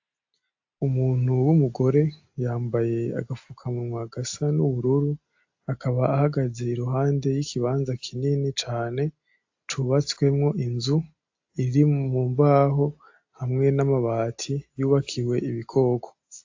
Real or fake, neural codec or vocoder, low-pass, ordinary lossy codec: real; none; 7.2 kHz; AAC, 48 kbps